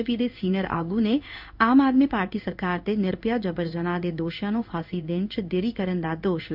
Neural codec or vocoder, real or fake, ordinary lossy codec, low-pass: codec, 16 kHz in and 24 kHz out, 1 kbps, XY-Tokenizer; fake; none; 5.4 kHz